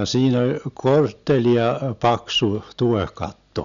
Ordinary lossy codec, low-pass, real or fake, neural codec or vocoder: none; 7.2 kHz; real; none